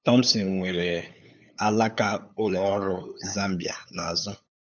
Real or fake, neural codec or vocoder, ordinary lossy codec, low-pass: fake; codec, 16 kHz, 8 kbps, FunCodec, trained on LibriTTS, 25 frames a second; none; 7.2 kHz